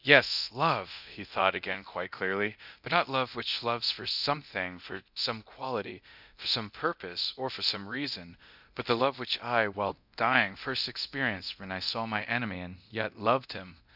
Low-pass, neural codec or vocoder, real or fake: 5.4 kHz; codec, 24 kHz, 0.9 kbps, DualCodec; fake